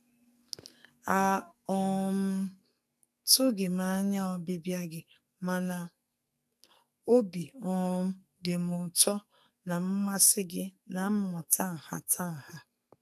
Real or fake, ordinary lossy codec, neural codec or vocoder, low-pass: fake; none; codec, 44.1 kHz, 2.6 kbps, SNAC; 14.4 kHz